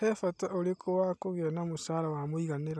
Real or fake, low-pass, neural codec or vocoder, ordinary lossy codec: real; none; none; none